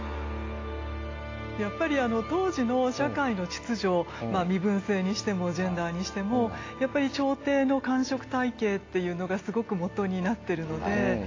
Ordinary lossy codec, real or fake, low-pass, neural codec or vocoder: AAC, 32 kbps; real; 7.2 kHz; none